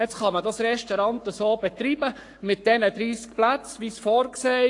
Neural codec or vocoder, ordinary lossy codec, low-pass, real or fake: codec, 44.1 kHz, 7.8 kbps, Pupu-Codec; AAC, 48 kbps; 10.8 kHz; fake